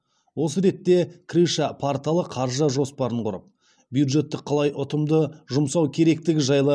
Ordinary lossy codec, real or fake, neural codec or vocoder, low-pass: none; real; none; none